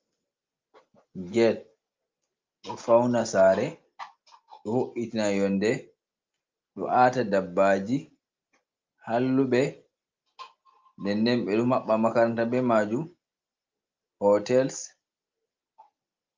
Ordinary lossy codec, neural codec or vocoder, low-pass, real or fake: Opus, 24 kbps; none; 7.2 kHz; real